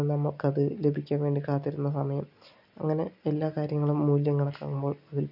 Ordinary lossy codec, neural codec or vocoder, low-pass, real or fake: none; none; 5.4 kHz; real